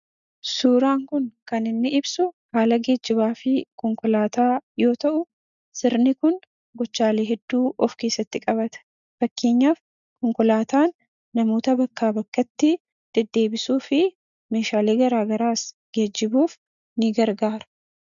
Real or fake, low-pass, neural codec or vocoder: real; 7.2 kHz; none